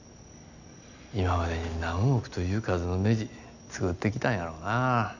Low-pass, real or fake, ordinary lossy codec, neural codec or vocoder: 7.2 kHz; real; none; none